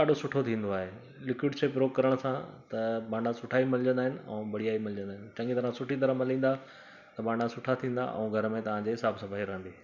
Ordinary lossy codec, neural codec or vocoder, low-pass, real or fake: none; none; 7.2 kHz; real